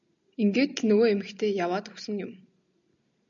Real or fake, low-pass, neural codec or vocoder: real; 7.2 kHz; none